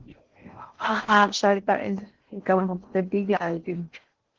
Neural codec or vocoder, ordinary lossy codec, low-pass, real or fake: codec, 16 kHz in and 24 kHz out, 0.6 kbps, FocalCodec, streaming, 4096 codes; Opus, 16 kbps; 7.2 kHz; fake